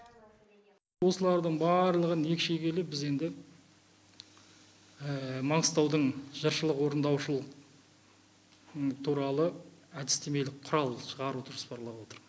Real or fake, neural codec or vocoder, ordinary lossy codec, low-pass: real; none; none; none